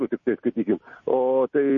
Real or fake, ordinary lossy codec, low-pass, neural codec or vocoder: real; MP3, 32 kbps; 7.2 kHz; none